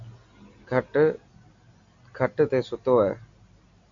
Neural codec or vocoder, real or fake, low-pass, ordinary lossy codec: none; real; 7.2 kHz; AAC, 64 kbps